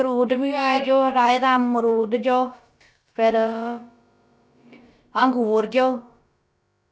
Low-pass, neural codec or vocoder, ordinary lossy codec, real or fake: none; codec, 16 kHz, about 1 kbps, DyCAST, with the encoder's durations; none; fake